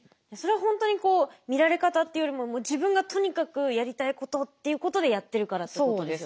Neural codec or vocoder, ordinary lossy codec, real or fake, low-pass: none; none; real; none